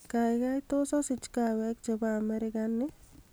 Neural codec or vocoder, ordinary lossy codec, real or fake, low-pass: none; none; real; none